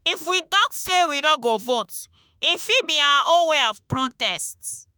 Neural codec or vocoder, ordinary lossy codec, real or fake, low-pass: autoencoder, 48 kHz, 32 numbers a frame, DAC-VAE, trained on Japanese speech; none; fake; none